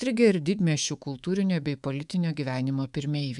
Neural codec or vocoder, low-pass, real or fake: codec, 24 kHz, 3.1 kbps, DualCodec; 10.8 kHz; fake